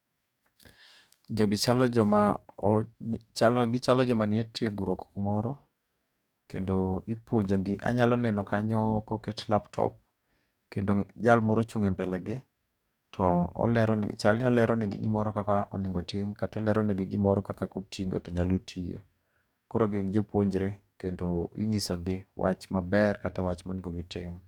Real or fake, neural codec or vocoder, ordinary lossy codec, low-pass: fake; codec, 44.1 kHz, 2.6 kbps, DAC; none; 19.8 kHz